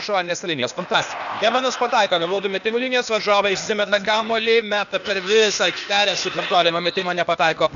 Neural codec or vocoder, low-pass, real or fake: codec, 16 kHz, 0.8 kbps, ZipCodec; 7.2 kHz; fake